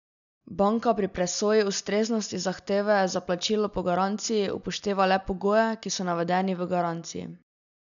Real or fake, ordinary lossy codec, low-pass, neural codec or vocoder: real; none; 7.2 kHz; none